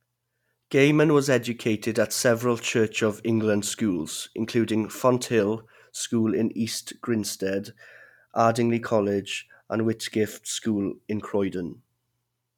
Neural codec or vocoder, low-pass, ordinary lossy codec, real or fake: none; 19.8 kHz; none; real